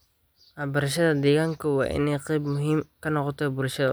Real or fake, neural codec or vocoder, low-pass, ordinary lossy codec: real; none; none; none